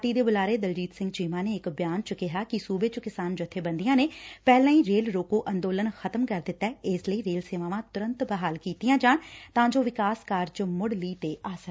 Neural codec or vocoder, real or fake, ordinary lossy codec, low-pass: none; real; none; none